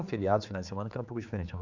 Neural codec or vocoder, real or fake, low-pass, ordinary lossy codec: codec, 16 kHz, 4 kbps, X-Codec, HuBERT features, trained on general audio; fake; 7.2 kHz; Opus, 64 kbps